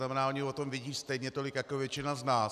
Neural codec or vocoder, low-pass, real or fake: none; 14.4 kHz; real